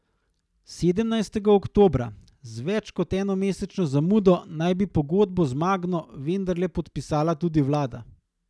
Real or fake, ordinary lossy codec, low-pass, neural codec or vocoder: real; none; none; none